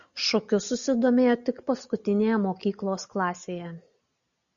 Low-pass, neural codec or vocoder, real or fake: 7.2 kHz; none; real